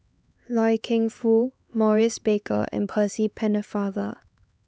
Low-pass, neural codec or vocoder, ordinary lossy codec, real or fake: none; codec, 16 kHz, 4 kbps, X-Codec, HuBERT features, trained on LibriSpeech; none; fake